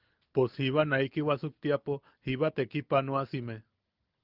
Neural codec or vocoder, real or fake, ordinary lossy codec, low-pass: none; real; Opus, 32 kbps; 5.4 kHz